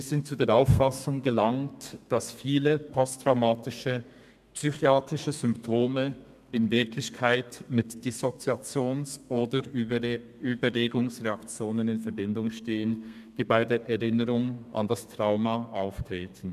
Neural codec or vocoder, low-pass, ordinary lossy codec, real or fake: codec, 32 kHz, 1.9 kbps, SNAC; 14.4 kHz; MP3, 96 kbps; fake